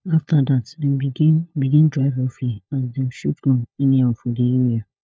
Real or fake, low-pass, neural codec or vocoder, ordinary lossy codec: fake; none; codec, 16 kHz, 4 kbps, FunCodec, trained on LibriTTS, 50 frames a second; none